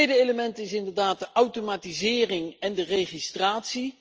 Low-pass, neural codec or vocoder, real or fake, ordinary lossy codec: 7.2 kHz; none; real; Opus, 24 kbps